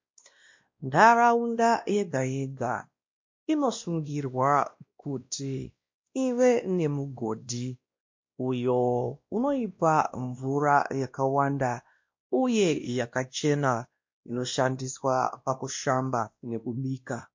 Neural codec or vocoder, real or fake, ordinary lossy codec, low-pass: codec, 16 kHz, 1 kbps, X-Codec, WavLM features, trained on Multilingual LibriSpeech; fake; MP3, 48 kbps; 7.2 kHz